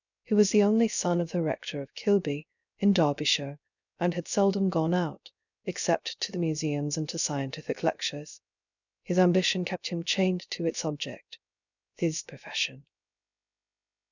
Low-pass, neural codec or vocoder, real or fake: 7.2 kHz; codec, 16 kHz, 0.3 kbps, FocalCodec; fake